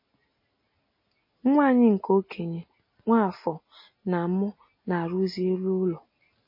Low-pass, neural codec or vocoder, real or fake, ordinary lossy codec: 5.4 kHz; none; real; MP3, 24 kbps